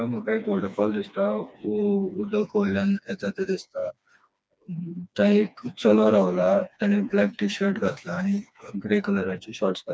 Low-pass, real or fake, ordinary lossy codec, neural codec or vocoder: none; fake; none; codec, 16 kHz, 2 kbps, FreqCodec, smaller model